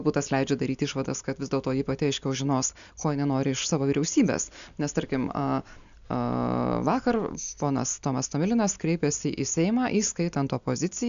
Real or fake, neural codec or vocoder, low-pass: real; none; 7.2 kHz